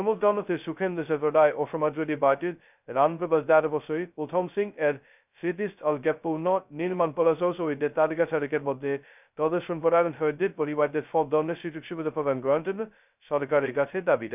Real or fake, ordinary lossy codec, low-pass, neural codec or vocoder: fake; none; 3.6 kHz; codec, 16 kHz, 0.2 kbps, FocalCodec